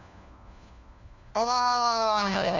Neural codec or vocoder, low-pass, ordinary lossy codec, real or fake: codec, 16 kHz, 1 kbps, FunCodec, trained on LibriTTS, 50 frames a second; 7.2 kHz; none; fake